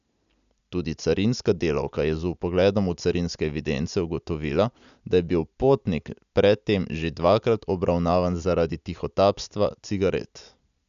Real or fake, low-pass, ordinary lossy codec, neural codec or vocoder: real; 7.2 kHz; none; none